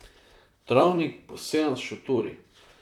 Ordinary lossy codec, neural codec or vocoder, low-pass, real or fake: none; vocoder, 44.1 kHz, 128 mel bands, Pupu-Vocoder; 19.8 kHz; fake